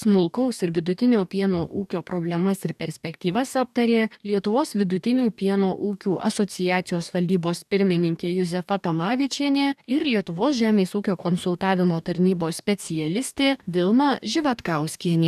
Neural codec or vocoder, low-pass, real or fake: codec, 44.1 kHz, 2.6 kbps, DAC; 14.4 kHz; fake